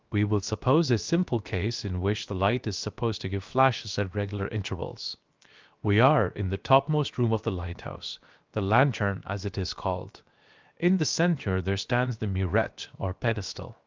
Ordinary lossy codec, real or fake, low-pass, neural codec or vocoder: Opus, 24 kbps; fake; 7.2 kHz; codec, 16 kHz, 0.7 kbps, FocalCodec